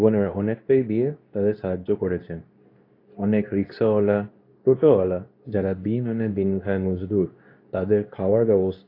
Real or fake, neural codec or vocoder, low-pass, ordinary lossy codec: fake; codec, 24 kHz, 0.9 kbps, WavTokenizer, medium speech release version 2; 5.4 kHz; AAC, 32 kbps